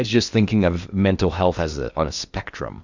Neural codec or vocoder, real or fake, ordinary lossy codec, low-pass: codec, 16 kHz in and 24 kHz out, 0.8 kbps, FocalCodec, streaming, 65536 codes; fake; Opus, 64 kbps; 7.2 kHz